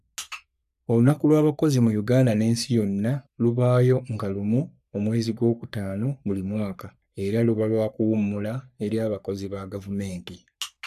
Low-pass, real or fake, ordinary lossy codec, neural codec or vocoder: 14.4 kHz; fake; none; codec, 44.1 kHz, 3.4 kbps, Pupu-Codec